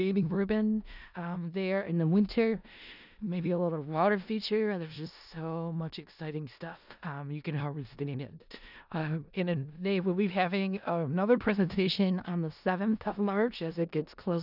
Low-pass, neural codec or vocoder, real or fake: 5.4 kHz; codec, 16 kHz in and 24 kHz out, 0.4 kbps, LongCat-Audio-Codec, four codebook decoder; fake